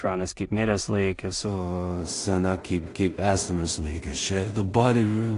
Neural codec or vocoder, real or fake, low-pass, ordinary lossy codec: codec, 16 kHz in and 24 kHz out, 0.4 kbps, LongCat-Audio-Codec, two codebook decoder; fake; 10.8 kHz; AAC, 48 kbps